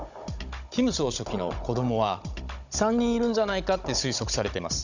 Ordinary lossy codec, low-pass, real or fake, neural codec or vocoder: none; 7.2 kHz; fake; codec, 16 kHz, 16 kbps, FunCodec, trained on Chinese and English, 50 frames a second